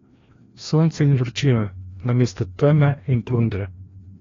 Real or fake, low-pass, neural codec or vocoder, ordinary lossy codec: fake; 7.2 kHz; codec, 16 kHz, 1 kbps, FreqCodec, larger model; AAC, 32 kbps